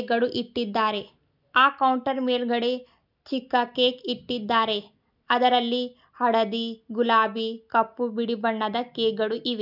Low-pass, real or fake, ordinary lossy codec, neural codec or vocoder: 5.4 kHz; real; none; none